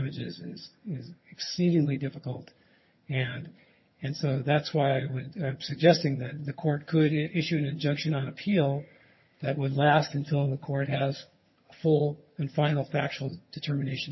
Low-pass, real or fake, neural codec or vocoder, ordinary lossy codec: 7.2 kHz; fake; vocoder, 22.05 kHz, 80 mel bands, HiFi-GAN; MP3, 24 kbps